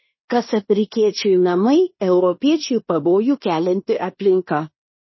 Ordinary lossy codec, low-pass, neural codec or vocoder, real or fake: MP3, 24 kbps; 7.2 kHz; codec, 16 kHz in and 24 kHz out, 0.9 kbps, LongCat-Audio-Codec, fine tuned four codebook decoder; fake